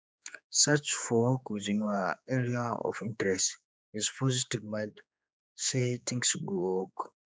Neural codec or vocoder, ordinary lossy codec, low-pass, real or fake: codec, 16 kHz, 4 kbps, X-Codec, HuBERT features, trained on general audio; none; none; fake